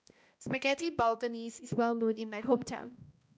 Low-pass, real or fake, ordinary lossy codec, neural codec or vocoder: none; fake; none; codec, 16 kHz, 1 kbps, X-Codec, HuBERT features, trained on balanced general audio